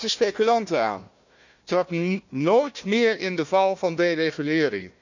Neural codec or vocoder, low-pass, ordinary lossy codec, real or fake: codec, 16 kHz, 1 kbps, FunCodec, trained on Chinese and English, 50 frames a second; 7.2 kHz; none; fake